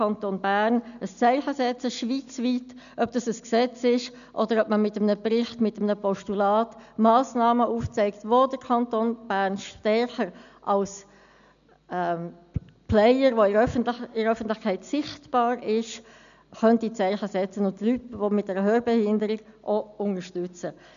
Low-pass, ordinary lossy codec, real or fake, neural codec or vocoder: 7.2 kHz; none; real; none